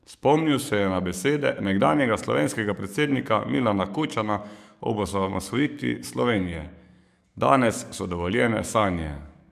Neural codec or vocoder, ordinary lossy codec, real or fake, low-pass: codec, 44.1 kHz, 7.8 kbps, DAC; none; fake; 14.4 kHz